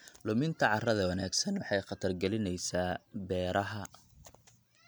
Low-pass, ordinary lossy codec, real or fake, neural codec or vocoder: none; none; real; none